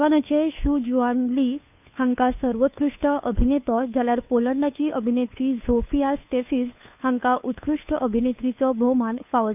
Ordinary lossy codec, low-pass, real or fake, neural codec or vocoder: none; 3.6 kHz; fake; codec, 16 kHz, 2 kbps, FunCodec, trained on Chinese and English, 25 frames a second